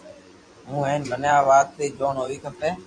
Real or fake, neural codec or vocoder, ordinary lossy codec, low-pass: fake; vocoder, 24 kHz, 100 mel bands, Vocos; AAC, 64 kbps; 9.9 kHz